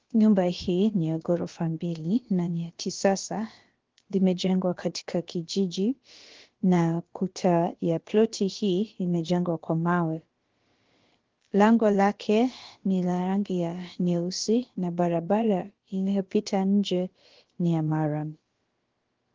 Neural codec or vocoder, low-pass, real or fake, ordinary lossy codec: codec, 16 kHz, about 1 kbps, DyCAST, with the encoder's durations; 7.2 kHz; fake; Opus, 16 kbps